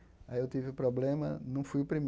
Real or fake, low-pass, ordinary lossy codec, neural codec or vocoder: real; none; none; none